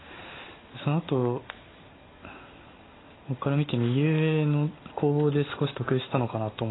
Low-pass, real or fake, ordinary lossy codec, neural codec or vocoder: 7.2 kHz; real; AAC, 16 kbps; none